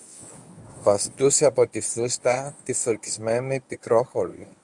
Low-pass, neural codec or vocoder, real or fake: 10.8 kHz; codec, 24 kHz, 0.9 kbps, WavTokenizer, medium speech release version 1; fake